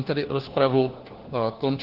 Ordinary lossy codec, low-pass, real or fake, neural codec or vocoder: Opus, 16 kbps; 5.4 kHz; fake; codec, 16 kHz, 1 kbps, FunCodec, trained on LibriTTS, 50 frames a second